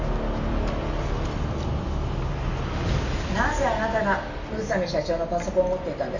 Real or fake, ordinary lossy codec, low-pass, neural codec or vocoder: real; none; 7.2 kHz; none